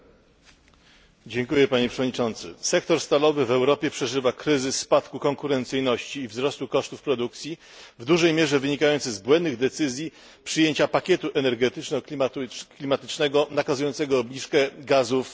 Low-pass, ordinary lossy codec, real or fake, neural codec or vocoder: none; none; real; none